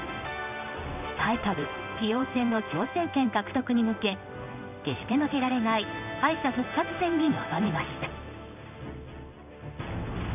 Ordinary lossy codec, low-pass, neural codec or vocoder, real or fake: none; 3.6 kHz; codec, 16 kHz in and 24 kHz out, 1 kbps, XY-Tokenizer; fake